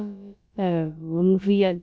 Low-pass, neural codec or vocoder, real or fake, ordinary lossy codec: none; codec, 16 kHz, about 1 kbps, DyCAST, with the encoder's durations; fake; none